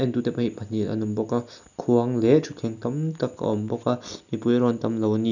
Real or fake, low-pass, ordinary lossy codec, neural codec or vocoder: real; 7.2 kHz; none; none